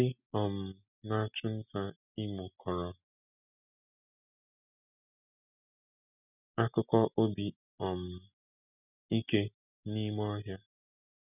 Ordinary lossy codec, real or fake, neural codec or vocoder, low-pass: none; real; none; 3.6 kHz